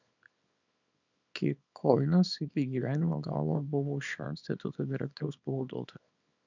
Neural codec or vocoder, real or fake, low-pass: codec, 24 kHz, 0.9 kbps, WavTokenizer, small release; fake; 7.2 kHz